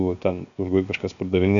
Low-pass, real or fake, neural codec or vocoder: 7.2 kHz; fake; codec, 16 kHz, 0.7 kbps, FocalCodec